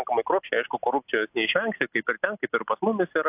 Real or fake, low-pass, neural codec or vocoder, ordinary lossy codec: real; 3.6 kHz; none; AAC, 32 kbps